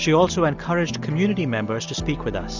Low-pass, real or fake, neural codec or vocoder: 7.2 kHz; real; none